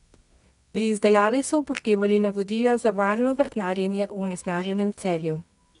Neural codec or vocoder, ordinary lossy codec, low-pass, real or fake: codec, 24 kHz, 0.9 kbps, WavTokenizer, medium music audio release; none; 10.8 kHz; fake